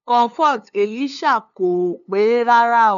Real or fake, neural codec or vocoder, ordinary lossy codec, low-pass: fake; codec, 16 kHz, 2 kbps, FunCodec, trained on LibriTTS, 25 frames a second; none; 7.2 kHz